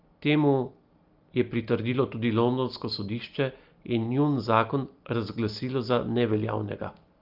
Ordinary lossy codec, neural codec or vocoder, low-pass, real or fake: Opus, 32 kbps; none; 5.4 kHz; real